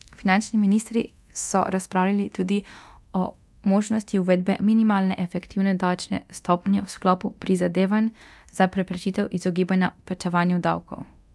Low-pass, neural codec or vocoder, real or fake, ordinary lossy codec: none; codec, 24 kHz, 0.9 kbps, DualCodec; fake; none